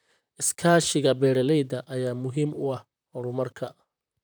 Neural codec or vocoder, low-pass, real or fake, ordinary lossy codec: vocoder, 44.1 kHz, 128 mel bands, Pupu-Vocoder; none; fake; none